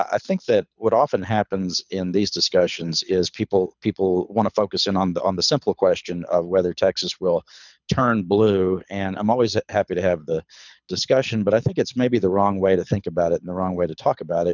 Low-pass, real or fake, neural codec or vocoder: 7.2 kHz; fake; codec, 24 kHz, 6 kbps, HILCodec